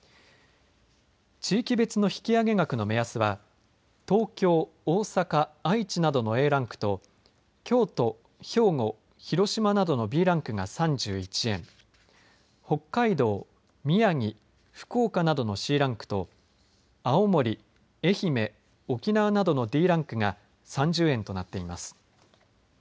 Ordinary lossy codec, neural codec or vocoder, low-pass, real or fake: none; none; none; real